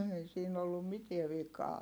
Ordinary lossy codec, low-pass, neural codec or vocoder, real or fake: none; none; none; real